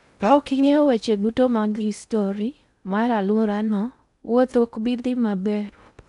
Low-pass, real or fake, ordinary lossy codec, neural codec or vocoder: 10.8 kHz; fake; none; codec, 16 kHz in and 24 kHz out, 0.6 kbps, FocalCodec, streaming, 2048 codes